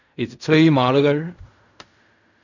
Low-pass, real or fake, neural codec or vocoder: 7.2 kHz; fake; codec, 16 kHz in and 24 kHz out, 0.4 kbps, LongCat-Audio-Codec, fine tuned four codebook decoder